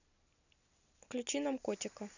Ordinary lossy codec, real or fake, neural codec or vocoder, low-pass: none; real; none; 7.2 kHz